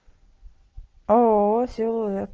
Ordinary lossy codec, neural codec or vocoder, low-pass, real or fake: Opus, 16 kbps; none; 7.2 kHz; real